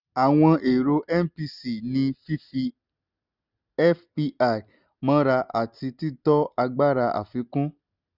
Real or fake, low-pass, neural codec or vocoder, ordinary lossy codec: real; 5.4 kHz; none; none